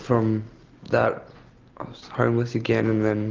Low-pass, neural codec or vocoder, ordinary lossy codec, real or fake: 7.2 kHz; none; Opus, 24 kbps; real